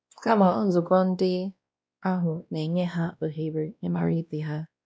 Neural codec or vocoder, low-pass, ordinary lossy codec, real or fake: codec, 16 kHz, 1 kbps, X-Codec, WavLM features, trained on Multilingual LibriSpeech; none; none; fake